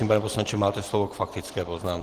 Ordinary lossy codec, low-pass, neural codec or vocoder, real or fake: Opus, 16 kbps; 14.4 kHz; none; real